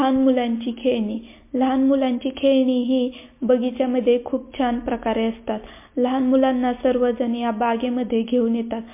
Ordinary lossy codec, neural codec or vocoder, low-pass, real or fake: MP3, 24 kbps; none; 3.6 kHz; real